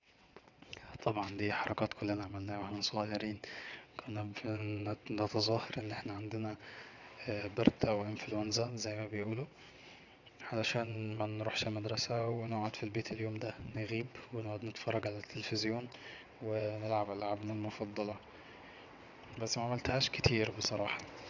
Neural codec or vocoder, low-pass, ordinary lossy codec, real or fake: vocoder, 22.05 kHz, 80 mel bands, WaveNeXt; 7.2 kHz; none; fake